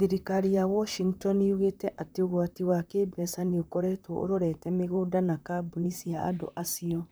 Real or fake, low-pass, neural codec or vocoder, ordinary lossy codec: fake; none; vocoder, 44.1 kHz, 128 mel bands, Pupu-Vocoder; none